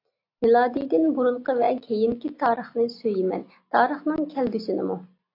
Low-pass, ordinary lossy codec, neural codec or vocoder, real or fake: 5.4 kHz; AAC, 32 kbps; none; real